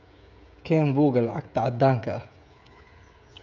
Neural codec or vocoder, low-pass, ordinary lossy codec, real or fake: codec, 16 kHz, 16 kbps, FreqCodec, smaller model; 7.2 kHz; none; fake